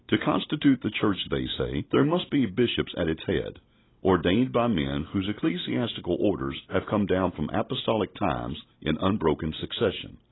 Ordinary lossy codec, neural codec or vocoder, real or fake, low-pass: AAC, 16 kbps; none; real; 7.2 kHz